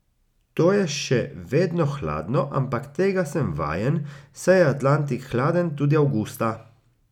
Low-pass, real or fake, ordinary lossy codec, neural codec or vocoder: 19.8 kHz; real; none; none